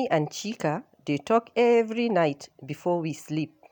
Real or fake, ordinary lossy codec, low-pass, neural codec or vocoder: real; none; 19.8 kHz; none